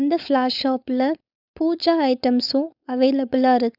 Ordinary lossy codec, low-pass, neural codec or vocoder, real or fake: none; 5.4 kHz; codec, 16 kHz, 4.8 kbps, FACodec; fake